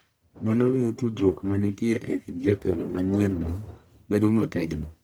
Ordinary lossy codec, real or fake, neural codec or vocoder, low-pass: none; fake; codec, 44.1 kHz, 1.7 kbps, Pupu-Codec; none